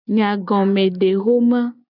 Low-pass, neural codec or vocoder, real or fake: 5.4 kHz; none; real